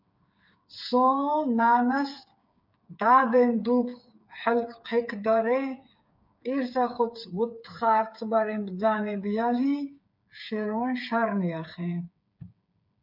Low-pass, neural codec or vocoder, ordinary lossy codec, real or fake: 5.4 kHz; codec, 16 kHz, 8 kbps, FreqCodec, smaller model; MP3, 48 kbps; fake